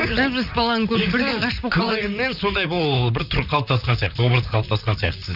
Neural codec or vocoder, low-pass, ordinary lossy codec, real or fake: vocoder, 44.1 kHz, 128 mel bands, Pupu-Vocoder; 5.4 kHz; none; fake